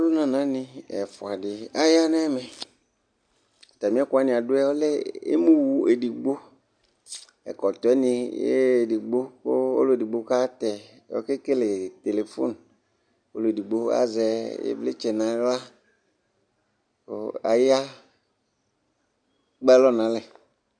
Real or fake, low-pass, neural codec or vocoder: real; 9.9 kHz; none